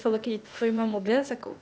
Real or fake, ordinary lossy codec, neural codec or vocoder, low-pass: fake; none; codec, 16 kHz, 0.8 kbps, ZipCodec; none